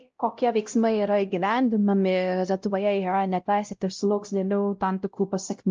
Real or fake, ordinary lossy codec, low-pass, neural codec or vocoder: fake; Opus, 24 kbps; 7.2 kHz; codec, 16 kHz, 0.5 kbps, X-Codec, WavLM features, trained on Multilingual LibriSpeech